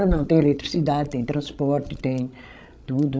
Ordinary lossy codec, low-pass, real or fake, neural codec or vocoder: none; none; fake; codec, 16 kHz, 16 kbps, FreqCodec, larger model